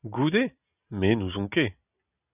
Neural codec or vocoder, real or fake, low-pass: none; real; 3.6 kHz